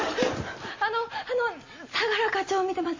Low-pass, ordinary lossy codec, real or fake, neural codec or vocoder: 7.2 kHz; MP3, 32 kbps; real; none